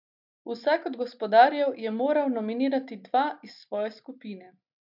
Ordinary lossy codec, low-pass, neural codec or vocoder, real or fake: none; 5.4 kHz; none; real